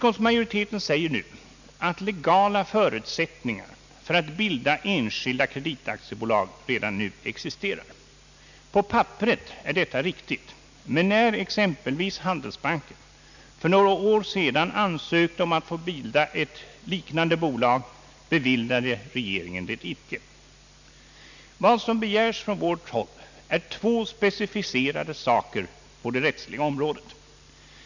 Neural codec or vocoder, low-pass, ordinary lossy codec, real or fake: none; 7.2 kHz; none; real